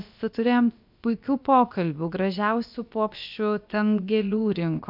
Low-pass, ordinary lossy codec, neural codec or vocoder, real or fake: 5.4 kHz; MP3, 48 kbps; codec, 16 kHz, about 1 kbps, DyCAST, with the encoder's durations; fake